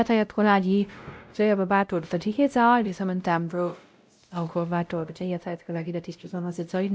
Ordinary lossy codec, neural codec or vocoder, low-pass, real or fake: none; codec, 16 kHz, 0.5 kbps, X-Codec, WavLM features, trained on Multilingual LibriSpeech; none; fake